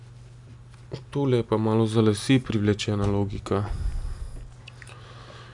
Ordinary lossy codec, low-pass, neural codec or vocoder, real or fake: none; 10.8 kHz; none; real